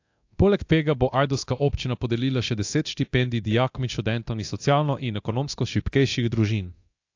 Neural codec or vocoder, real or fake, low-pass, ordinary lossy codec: codec, 24 kHz, 0.9 kbps, DualCodec; fake; 7.2 kHz; AAC, 48 kbps